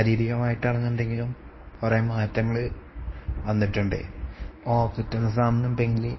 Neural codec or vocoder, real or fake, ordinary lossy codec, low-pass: codec, 24 kHz, 0.9 kbps, WavTokenizer, medium speech release version 1; fake; MP3, 24 kbps; 7.2 kHz